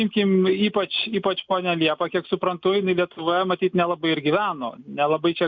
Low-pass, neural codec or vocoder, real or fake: 7.2 kHz; none; real